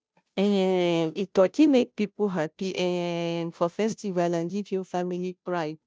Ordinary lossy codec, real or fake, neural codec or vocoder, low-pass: none; fake; codec, 16 kHz, 0.5 kbps, FunCodec, trained on Chinese and English, 25 frames a second; none